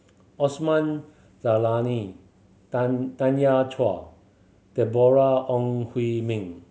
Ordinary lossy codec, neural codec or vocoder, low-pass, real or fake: none; none; none; real